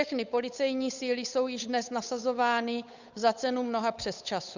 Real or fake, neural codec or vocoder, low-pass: fake; codec, 16 kHz, 8 kbps, FunCodec, trained on Chinese and English, 25 frames a second; 7.2 kHz